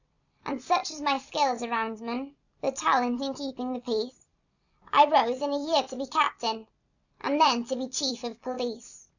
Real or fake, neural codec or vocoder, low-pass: fake; vocoder, 44.1 kHz, 128 mel bands every 256 samples, BigVGAN v2; 7.2 kHz